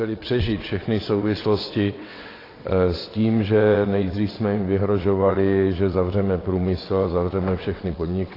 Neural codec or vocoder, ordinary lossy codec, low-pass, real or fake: vocoder, 22.05 kHz, 80 mel bands, WaveNeXt; AAC, 24 kbps; 5.4 kHz; fake